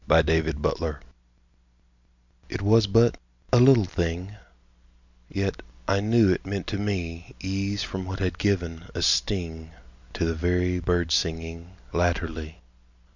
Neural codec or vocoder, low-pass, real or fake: none; 7.2 kHz; real